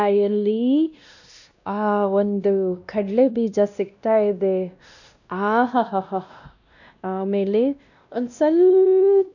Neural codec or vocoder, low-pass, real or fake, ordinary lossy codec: codec, 16 kHz, 1 kbps, X-Codec, WavLM features, trained on Multilingual LibriSpeech; 7.2 kHz; fake; none